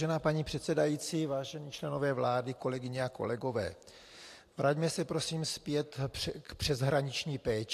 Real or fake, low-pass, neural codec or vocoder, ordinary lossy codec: fake; 14.4 kHz; vocoder, 44.1 kHz, 128 mel bands every 512 samples, BigVGAN v2; AAC, 64 kbps